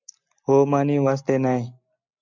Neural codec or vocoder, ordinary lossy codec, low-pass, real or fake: none; MP3, 64 kbps; 7.2 kHz; real